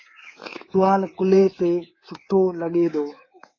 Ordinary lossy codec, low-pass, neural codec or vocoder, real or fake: AAC, 32 kbps; 7.2 kHz; codec, 24 kHz, 3.1 kbps, DualCodec; fake